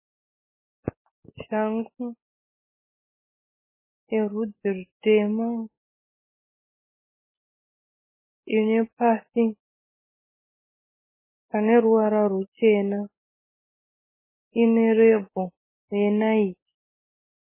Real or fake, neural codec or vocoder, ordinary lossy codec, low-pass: real; none; MP3, 16 kbps; 3.6 kHz